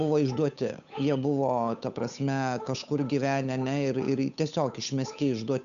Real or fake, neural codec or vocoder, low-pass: fake; codec, 16 kHz, 8 kbps, FunCodec, trained on Chinese and English, 25 frames a second; 7.2 kHz